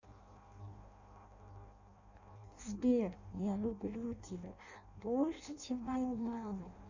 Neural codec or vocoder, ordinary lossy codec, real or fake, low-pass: codec, 16 kHz in and 24 kHz out, 0.6 kbps, FireRedTTS-2 codec; none; fake; 7.2 kHz